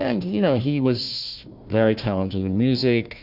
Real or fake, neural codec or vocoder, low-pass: fake; codec, 16 kHz, 1 kbps, FunCodec, trained on Chinese and English, 50 frames a second; 5.4 kHz